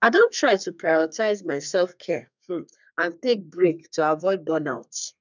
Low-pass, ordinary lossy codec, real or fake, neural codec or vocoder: 7.2 kHz; none; fake; codec, 32 kHz, 1.9 kbps, SNAC